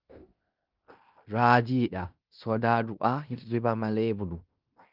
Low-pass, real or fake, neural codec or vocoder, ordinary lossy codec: 5.4 kHz; fake; codec, 16 kHz in and 24 kHz out, 0.9 kbps, LongCat-Audio-Codec, fine tuned four codebook decoder; Opus, 32 kbps